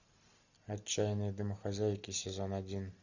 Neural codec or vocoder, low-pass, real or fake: none; 7.2 kHz; real